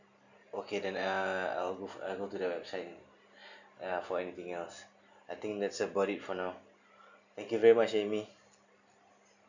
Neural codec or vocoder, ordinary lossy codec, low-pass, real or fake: none; none; 7.2 kHz; real